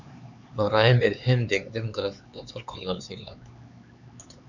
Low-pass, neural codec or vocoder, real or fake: 7.2 kHz; codec, 16 kHz, 4 kbps, X-Codec, HuBERT features, trained on LibriSpeech; fake